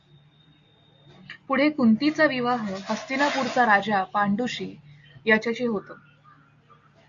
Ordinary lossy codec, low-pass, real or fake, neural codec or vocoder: Opus, 64 kbps; 7.2 kHz; real; none